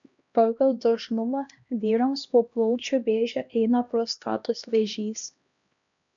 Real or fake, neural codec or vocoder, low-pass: fake; codec, 16 kHz, 1 kbps, X-Codec, HuBERT features, trained on LibriSpeech; 7.2 kHz